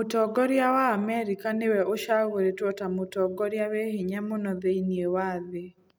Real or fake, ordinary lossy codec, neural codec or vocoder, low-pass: real; none; none; none